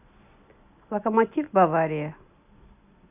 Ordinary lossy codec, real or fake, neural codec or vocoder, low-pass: none; real; none; 3.6 kHz